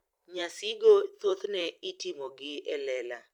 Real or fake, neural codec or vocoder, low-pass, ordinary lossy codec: fake; vocoder, 44.1 kHz, 128 mel bands every 512 samples, BigVGAN v2; 19.8 kHz; none